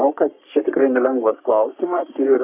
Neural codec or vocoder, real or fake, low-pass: codec, 44.1 kHz, 3.4 kbps, Pupu-Codec; fake; 3.6 kHz